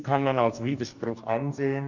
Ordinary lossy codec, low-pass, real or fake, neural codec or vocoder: none; 7.2 kHz; fake; codec, 44.1 kHz, 2.6 kbps, DAC